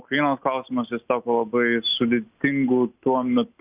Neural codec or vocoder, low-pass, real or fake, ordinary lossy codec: none; 3.6 kHz; real; Opus, 64 kbps